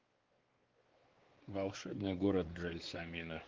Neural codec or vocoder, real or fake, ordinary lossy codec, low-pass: codec, 16 kHz, 4 kbps, X-Codec, WavLM features, trained on Multilingual LibriSpeech; fake; Opus, 16 kbps; 7.2 kHz